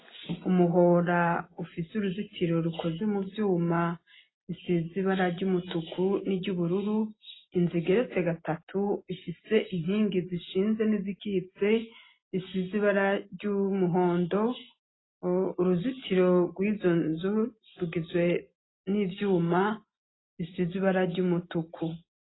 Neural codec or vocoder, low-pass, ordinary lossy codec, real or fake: none; 7.2 kHz; AAC, 16 kbps; real